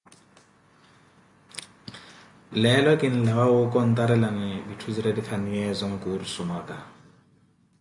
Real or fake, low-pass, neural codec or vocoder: real; 10.8 kHz; none